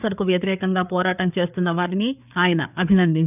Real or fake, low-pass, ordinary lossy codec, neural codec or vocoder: fake; 3.6 kHz; none; codec, 16 kHz, 4 kbps, FreqCodec, larger model